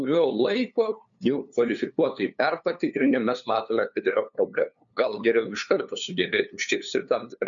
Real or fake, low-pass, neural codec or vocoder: fake; 7.2 kHz; codec, 16 kHz, 2 kbps, FunCodec, trained on LibriTTS, 25 frames a second